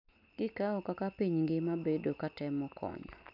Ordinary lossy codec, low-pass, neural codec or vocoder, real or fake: MP3, 48 kbps; 5.4 kHz; none; real